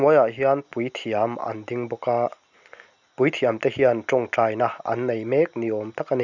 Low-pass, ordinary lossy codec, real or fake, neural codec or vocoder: 7.2 kHz; none; real; none